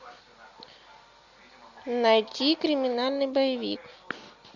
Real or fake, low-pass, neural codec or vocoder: real; 7.2 kHz; none